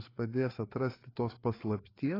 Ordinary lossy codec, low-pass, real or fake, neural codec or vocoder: AAC, 24 kbps; 5.4 kHz; fake; codec, 16 kHz, 4 kbps, FreqCodec, larger model